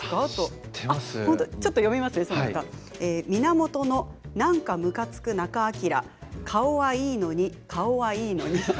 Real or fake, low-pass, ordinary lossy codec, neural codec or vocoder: real; none; none; none